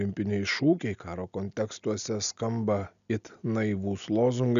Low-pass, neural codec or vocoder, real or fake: 7.2 kHz; none; real